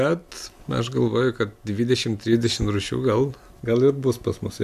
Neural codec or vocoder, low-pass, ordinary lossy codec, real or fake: none; 14.4 kHz; AAC, 96 kbps; real